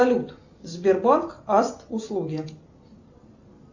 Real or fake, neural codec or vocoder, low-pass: real; none; 7.2 kHz